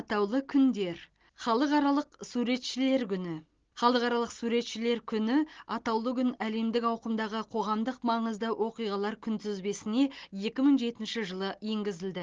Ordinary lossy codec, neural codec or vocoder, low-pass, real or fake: Opus, 16 kbps; none; 7.2 kHz; real